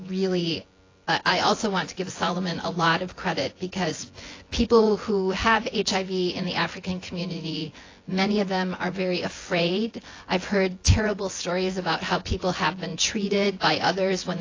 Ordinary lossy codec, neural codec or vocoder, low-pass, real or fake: AAC, 32 kbps; vocoder, 24 kHz, 100 mel bands, Vocos; 7.2 kHz; fake